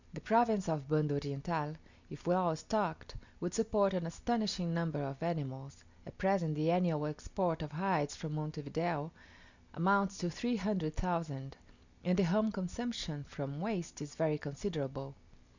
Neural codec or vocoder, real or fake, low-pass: none; real; 7.2 kHz